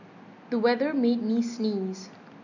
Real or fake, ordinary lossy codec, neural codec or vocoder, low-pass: real; none; none; 7.2 kHz